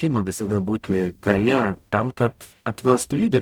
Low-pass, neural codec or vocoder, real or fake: 19.8 kHz; codec, 44.1 kHz, 0.9 kbps, DAC; fake